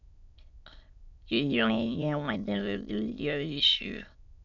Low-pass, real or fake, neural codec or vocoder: 7.2 kHz; fake; autoencoder, 22.05 kHz, a latent of 192 numbers a frame, VITS, trained on many speakers